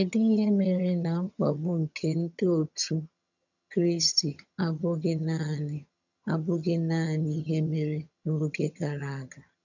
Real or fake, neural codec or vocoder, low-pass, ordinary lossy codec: fake; vocoder, 22.05 kHz, 80 mel bands, HiFi-GAN; 7.2 kHz; none